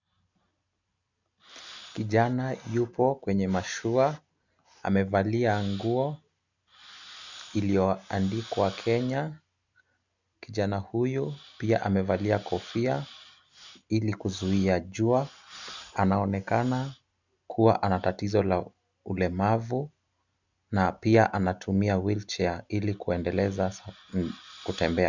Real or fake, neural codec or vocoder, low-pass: real; none; 7.2 kHz